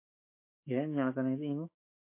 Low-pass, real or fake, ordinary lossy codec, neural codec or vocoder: 3.6 kHz; fake; MP3, 24 kbps; autoencoder, 48 kHz, 128 numbers a frame, DAC-VAE, trained on Japanese speech